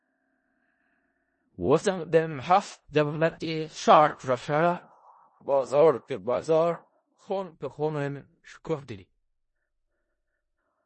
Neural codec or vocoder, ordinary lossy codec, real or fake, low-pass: codec, 16 kHz in and 24 kHz out, 0.4 kbps, LongCat-Audio-Codec, four codebook decoder; MP3, 32 kbps; fake; 10.8 kHz